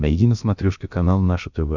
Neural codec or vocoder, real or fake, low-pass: codec, 16 kHz, about 1 kbps, DyCAST, with the encoder's durations; fake; 7.2 kHz